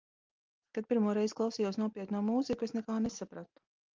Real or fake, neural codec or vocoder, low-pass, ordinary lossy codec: real; none; 7.2 kHz; Opus, 24 kbps